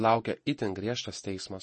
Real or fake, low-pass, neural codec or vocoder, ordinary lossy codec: fake; 10.8 kHz; vocoder, 48 kHz, 128 mel bands, Vocos; MP3, 32 kbps